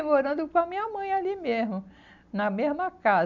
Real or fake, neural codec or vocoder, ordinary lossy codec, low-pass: real; none; none; 7.2 kHz